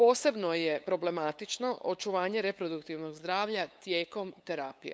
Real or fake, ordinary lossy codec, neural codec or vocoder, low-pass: fake; none; codec, 16 kHz, 8 kbps, FunCodec, trained on LibriTTS, 25 frames a second; none